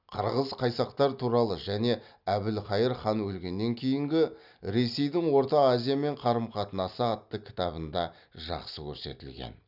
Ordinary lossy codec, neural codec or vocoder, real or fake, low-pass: none; none; real; 5.4 kHz